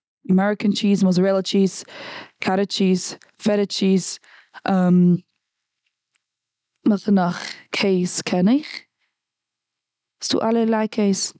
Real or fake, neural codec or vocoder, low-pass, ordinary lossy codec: real; none; none; none